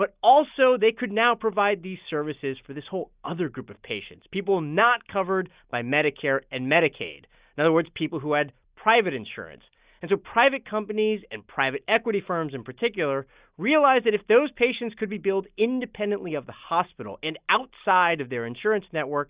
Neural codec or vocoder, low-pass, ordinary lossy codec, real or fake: none; 3.6 kHz; Opus, 64 kbps; real